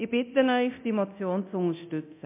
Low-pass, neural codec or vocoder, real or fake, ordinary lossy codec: 3.6 kHz; codec, 24 kHz, 0.9 kbps, DualCodec; fake; MP3, 32 kbps